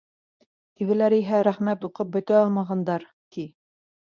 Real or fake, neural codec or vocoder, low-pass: fake; codec, 24 kHz, 0.9 kbps, WavTokenizer, medium speech release version 1; 7.2 kHz